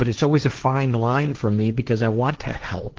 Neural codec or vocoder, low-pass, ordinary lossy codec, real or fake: codec, 16 kHz, 1.1 kbps, Voila-Tokenizer; 7.2 kHz; Opus, 16 kbps; fake